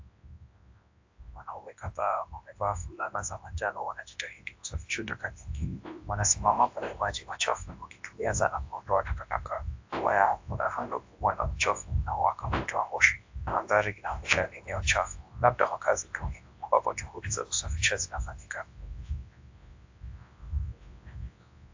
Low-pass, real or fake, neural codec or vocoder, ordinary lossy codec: 7.2 kHz; fake; codec, 24 kHz, 0.9 kbps, WavTokenizer, large speech release; AAC, 48 kbps